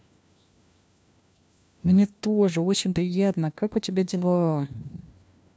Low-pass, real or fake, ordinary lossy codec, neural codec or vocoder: none; fake; none; codec, 16 kHz, 1 kbps, FunCodec, trained on LibriTTS, 50 frames a second